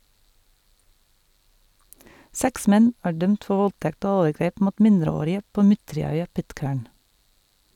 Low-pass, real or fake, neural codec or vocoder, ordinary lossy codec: 19.8 kHz; real; none; none